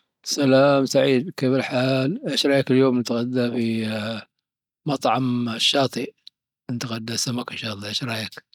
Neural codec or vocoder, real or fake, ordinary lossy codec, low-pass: none; real; none; 19.8 kHz